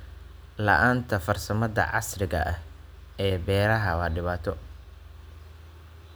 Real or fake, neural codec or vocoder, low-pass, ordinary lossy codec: real; none; none; none